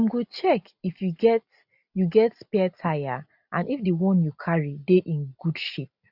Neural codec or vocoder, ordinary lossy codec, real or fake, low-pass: none; none; real; 5.4 kHz